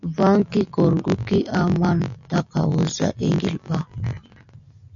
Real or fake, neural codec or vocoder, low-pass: real; none; 7.2 kHz